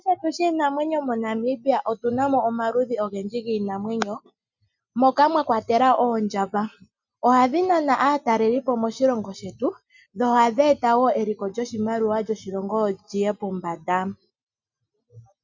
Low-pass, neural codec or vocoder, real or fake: 7.2 kHz; none; real